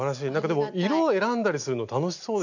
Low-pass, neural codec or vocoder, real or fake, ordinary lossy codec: 7.2 kHz; none; real; none